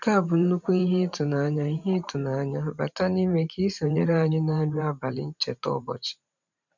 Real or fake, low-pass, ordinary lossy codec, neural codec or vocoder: fake; 7.2 kHz; none; vocoder, 44.1 kHz, 128 mel bands every 512 samples, BigVGAN v2